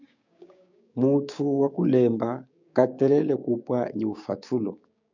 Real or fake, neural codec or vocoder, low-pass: fake; codec, 16 kHz, 6 kbps, DAC; 7.2 kHz